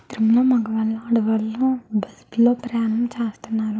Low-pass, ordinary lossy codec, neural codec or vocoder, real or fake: none; none; none; real